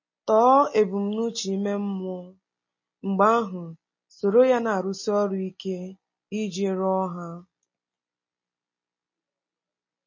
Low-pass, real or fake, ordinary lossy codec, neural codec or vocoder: 7.2 kHz; real; MP3, 32 kbps; none